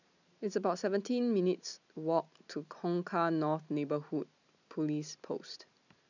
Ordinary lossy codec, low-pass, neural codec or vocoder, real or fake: none; 7.2 kHz; none; real